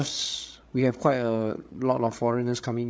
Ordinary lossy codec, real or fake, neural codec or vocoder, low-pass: none; fake; codec, 16 kHz, 8 kbps, FreqCodec, larger model; none